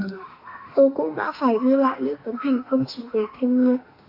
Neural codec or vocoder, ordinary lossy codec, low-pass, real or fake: autoencoder, 48 kHz, 32 numbers a frame, DAC-VAE, trained on Japanese speech; Opus, 64 kbps; 5.4 kHz; fake